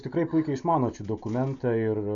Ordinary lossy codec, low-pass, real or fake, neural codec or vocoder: AAC, 64 kbps; 7.2 kHz; real; none